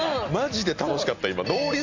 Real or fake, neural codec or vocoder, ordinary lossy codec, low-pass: real; none; MP3, 64 kbps; 7.2 kHz